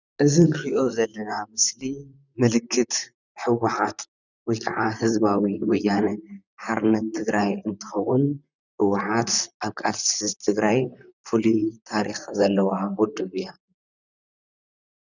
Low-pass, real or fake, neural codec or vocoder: 7.2 kHz; fake; vocoder, 44.1 kHz, 128 mel bands, Pupu-Vocoder